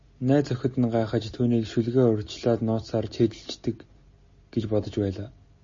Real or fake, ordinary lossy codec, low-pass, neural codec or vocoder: real; MP3, 32 kbps; 7.2 kHz; none